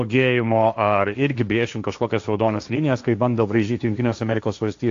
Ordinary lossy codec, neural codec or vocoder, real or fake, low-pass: AAC, 64 kbps; codec, 16 kHz, 1.1 kbps, Voila-Tokenizer; fake; 7.2 kHz